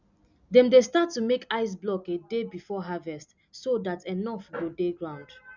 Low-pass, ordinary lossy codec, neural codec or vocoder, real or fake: 7.2 kHz; none; none; real